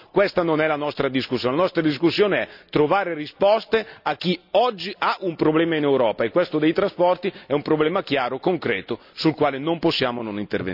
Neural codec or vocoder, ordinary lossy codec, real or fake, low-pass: none; none; real; 5.4 kHz